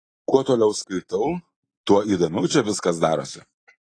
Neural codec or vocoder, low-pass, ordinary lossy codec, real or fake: none; 9.9 kHz; AAC, 32 kbps; real